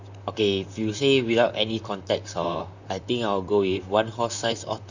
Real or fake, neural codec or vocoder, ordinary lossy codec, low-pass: fake; vocoder, 44.1 kHz, 128 mel bands, Pupu-Vocoder; none; 7.2 kHz